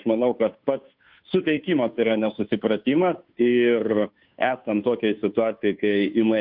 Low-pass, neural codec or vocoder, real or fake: 5.4 kHz; codec, 16 kHz, 2 kbps, FunCodec, trained on Chinese and English, 25 frames a second; fake